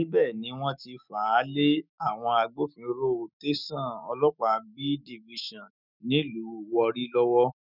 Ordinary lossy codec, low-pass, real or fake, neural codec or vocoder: none; 5.4 kHz; fake; autoencoder, 48 kHz, 128 numbers a frame, DAC-VAE, trained on Japanese speech